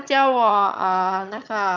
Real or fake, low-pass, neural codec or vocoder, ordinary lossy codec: fake; 7.2 kHz; vocoder, 22.05 kHz, 80 mel bands, HiFi-GAN; none